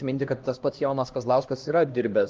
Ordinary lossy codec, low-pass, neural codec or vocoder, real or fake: Opus, 32 kbps; 7.2 kHz; codec, 16 kHz, 1 kbps, X-Codec, HuBERT features, trained on LibriSpeech; fake